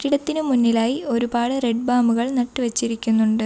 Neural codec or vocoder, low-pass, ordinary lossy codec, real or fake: none; none; none; real